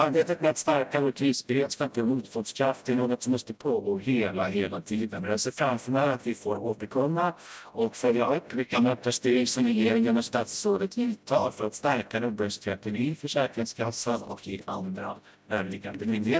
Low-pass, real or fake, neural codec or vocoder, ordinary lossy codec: none; fake; codec, 16 kHz, 0.5 kbps, FreqCodec, smaller model; none